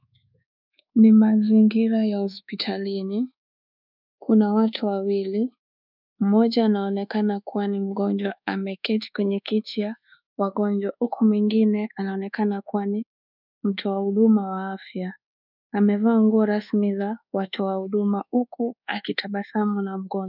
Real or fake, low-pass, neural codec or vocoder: fake; 5.4 kHz; codec, 24 kHz, 1.2 kbps, DualCodec